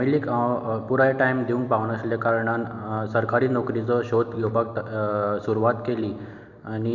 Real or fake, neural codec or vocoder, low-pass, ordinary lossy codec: real; none; 7.2 kHz; none